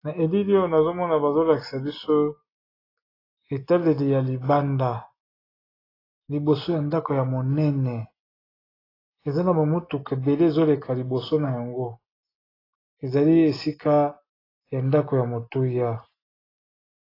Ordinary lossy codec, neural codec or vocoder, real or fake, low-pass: AAC, 24 kbps; none; real; 5.4 kHz